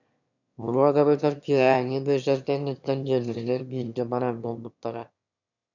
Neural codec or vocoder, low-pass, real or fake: autoencoder, 22.05 kHz, a latent of 192 numbers a frame, VITS, trained on one speaker; 7.2 kHz; fake